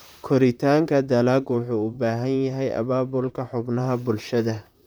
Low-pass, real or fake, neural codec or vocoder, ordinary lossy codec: none; fake; vocoder, 44.1 kHz, 128 mel bands, Pupu-Vocoder; none